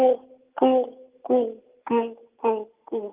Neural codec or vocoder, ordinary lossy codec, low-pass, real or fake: none; Opus, 32 kbps; 3.6 kHz; real